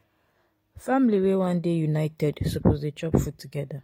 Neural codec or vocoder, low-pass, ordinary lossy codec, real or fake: none; 19.8 kHz; AAC, 48 kbps; real